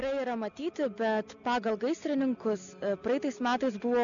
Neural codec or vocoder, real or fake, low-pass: none; real; 7.2 kHz